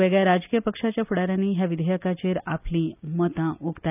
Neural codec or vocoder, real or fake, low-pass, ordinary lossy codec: none; real; 3.6 kHz; none